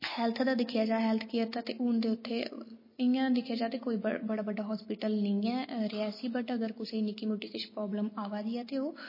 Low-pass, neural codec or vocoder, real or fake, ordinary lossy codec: 5.4 kHz; none; real; MP3, 24 kbps